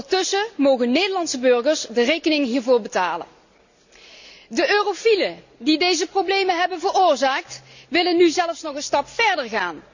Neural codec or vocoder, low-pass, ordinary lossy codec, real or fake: none; 7.2 kHz; none; real